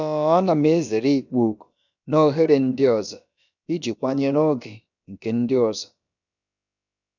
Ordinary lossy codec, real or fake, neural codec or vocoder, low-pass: none; fake; codec, 16 kHz, about 1 kbps, DyCAST, with the encoder's durations; 7.2 kHz